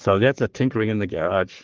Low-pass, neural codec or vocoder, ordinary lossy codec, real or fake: 7.2 kHz; codec, 44.1 kHz, 3.4 kbps, Pupu-Codec; Opus, 16 kbps; fake